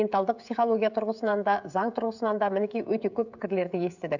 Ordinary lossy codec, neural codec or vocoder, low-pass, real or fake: none; codec, 16 kHz, 16 kbps, FreqCodec, smaller model; 7.2 kHz; fake